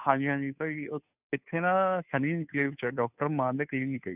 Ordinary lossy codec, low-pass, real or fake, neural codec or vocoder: none; 3.6 kHz; fake; codec, 16 kHz, 2 kbps, FunCodec, trained on Chinese and English, 25 frames a second